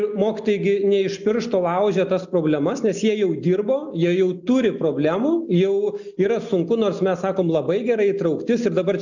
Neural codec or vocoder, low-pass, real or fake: none; 7.2 kHz; real